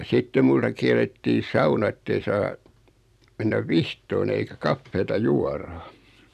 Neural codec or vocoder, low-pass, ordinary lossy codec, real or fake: vocoder, 44.1 kHz, 128 mel bands every 256 samples, BigVGAN v2; 14.4 kHz; none; fake